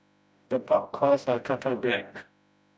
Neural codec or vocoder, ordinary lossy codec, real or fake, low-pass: codec, 16 kHz, 0.5 kbps, FreqCodec, smaller model; none; fake; none